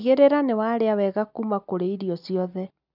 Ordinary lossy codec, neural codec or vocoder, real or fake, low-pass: none; none; real; 5.4 kHz